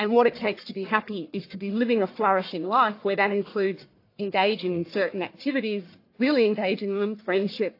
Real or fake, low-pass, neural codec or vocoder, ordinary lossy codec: fake; 5.4 kHz; codec, 44.1 kHz, 1.7 kbps, Pupu-Codec; AAC, 32 kbps